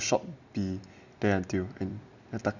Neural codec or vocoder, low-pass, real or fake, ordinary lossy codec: none; 7.2 kHz; real; none